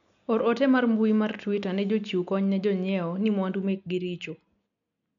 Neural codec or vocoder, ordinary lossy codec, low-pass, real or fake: none; none; 7.2 kHz; real